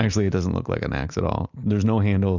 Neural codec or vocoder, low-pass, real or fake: none; 7.2 kHz; real